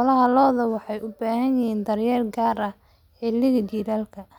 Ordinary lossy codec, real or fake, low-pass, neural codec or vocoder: none; real; 19.8 kHz; none